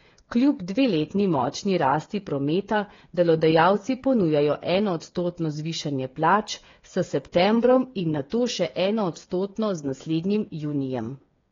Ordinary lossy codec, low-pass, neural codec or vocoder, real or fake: AAC, 32 kbps; 7.2 kHz; codec, 16 kHz, 8 kbps, FreqCodec, smaller model; fake